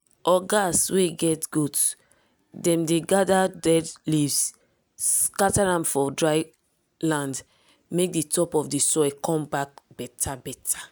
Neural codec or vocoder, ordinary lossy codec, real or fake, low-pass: none; none; real; none